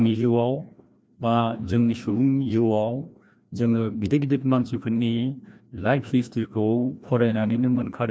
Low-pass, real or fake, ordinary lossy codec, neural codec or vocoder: none; fake; none; codec, 16 kHz, 1 kbps, FreqCodec, larger model